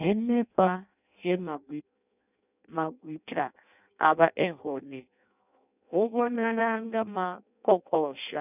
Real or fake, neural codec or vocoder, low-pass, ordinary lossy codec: fake; codec, 16 kHz in and 24 kHz out, 0.6 kbps, FireRedTTS-2 codec; 3.6 kHz; none